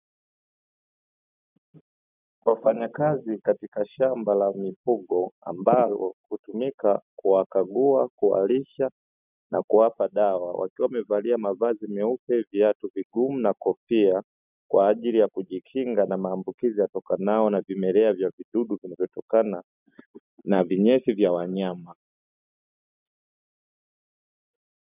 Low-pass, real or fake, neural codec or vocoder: 3.6 kHz; real; none